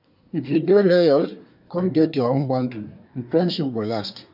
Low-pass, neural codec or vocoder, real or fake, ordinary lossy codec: 5.4 kHz; codec, 24 kHz, 1 kbps, SNAC; fake; none